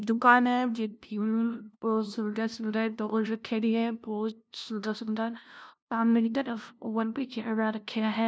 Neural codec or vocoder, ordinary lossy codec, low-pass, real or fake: codec, 16 kHz, 0.5 kbps, FunCodec, trained on LibriTTS, 25 frames a second; none; none; fake